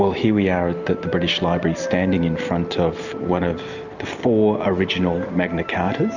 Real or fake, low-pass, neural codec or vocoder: real; 7.2 kHz; none